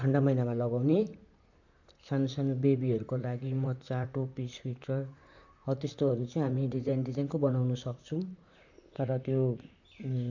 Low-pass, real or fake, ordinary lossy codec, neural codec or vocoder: 7.2 kHz; fake; none; vocoder, 44.1 kHz, 128 mel bands, Pupu-Vocoder